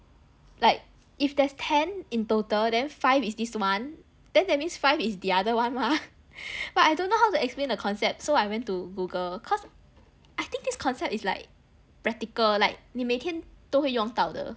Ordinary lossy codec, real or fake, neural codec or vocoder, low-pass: none; real; none; none